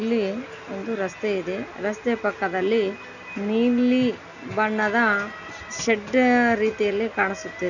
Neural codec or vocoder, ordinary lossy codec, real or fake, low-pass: none; none; real; 7.2 kHz